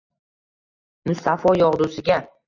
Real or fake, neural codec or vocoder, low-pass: real; none; 7.2 kHz